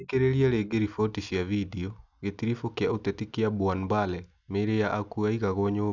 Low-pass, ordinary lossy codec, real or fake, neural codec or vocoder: 7.2 kHz; none; real; none